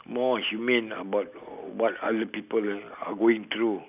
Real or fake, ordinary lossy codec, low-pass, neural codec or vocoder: real; none; 3.6 kHz; none